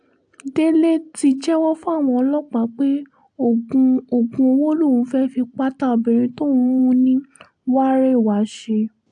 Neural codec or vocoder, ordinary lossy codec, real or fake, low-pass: none; AAC, 64 kbps; real; 9.9 kHz